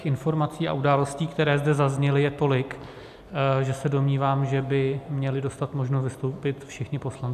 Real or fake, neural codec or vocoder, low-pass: real; none; 14.4 kHz